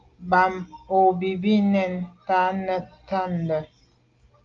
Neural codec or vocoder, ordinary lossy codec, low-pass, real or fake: none; Opus, 32 kbps; 7.2 kHz; real